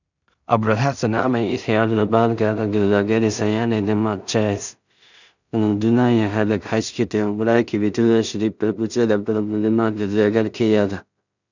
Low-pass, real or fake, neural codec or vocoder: 7.2 kHz; fake; codec, 16 kHz in and 24 kHz out, 0.4 kbps, LongCat-Audio-Codec, two codebook decoder